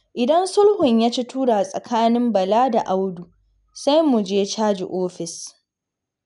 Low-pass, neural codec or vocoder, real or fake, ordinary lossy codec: 10.8 kHz; none; real; none